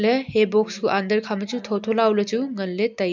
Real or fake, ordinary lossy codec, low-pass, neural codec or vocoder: real; MP3, 64 kbps; 7.2 kHz; none